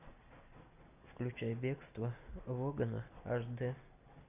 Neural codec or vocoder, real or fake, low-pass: none; real; 3.6 kHz